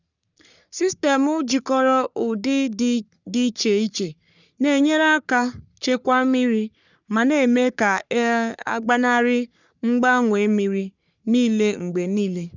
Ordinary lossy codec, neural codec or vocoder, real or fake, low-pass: none; codec, 44.1 kHz, 3.4 kbps, Pupu-Codec; fake; 7.2 kHz